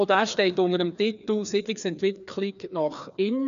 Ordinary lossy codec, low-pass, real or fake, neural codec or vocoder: none; 7.2 kHz; fake; codec, 16 kHz, 2 kbps, FreqCodec, larger model